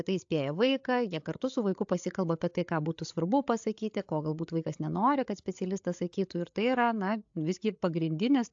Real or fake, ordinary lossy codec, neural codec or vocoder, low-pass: fake; AAC, 64 kbps; codec, 16 kHz, 8 kbps, FreqCodec, larger model; 7.2 kHz